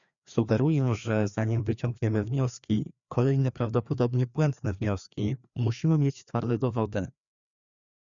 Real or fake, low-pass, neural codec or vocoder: fake; 7.2 kHz; codec, 16 kHz, 2 kbps, FreqCodec, larger model